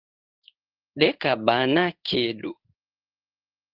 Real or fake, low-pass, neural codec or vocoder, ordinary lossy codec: fake; 5.4 kHz; codec, 16 kHz in and 24 kHz out, 1 kbps, XY-Tokenizer; Opus, 32 kbps